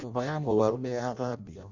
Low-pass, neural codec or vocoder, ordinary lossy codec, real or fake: 7.2 kHz; codec, 16 kHz in and 24 kHz out, 0.6 kbps, FireRedTTS-2 codec; none; fake